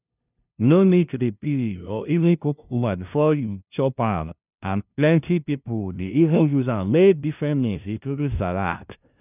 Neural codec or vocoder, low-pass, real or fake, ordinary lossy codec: codec, 16 kHz, 0.5 kbps, FunCodec, trained on LibriTTS, 25 frames a second; 3.6 kHz; fake; none